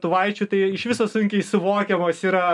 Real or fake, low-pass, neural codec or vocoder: real; 10.8 kHz; none